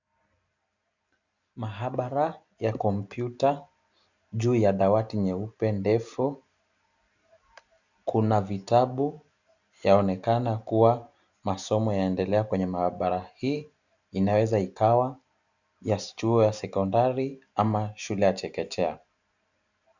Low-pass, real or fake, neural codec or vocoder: 7.2 kHz; real; none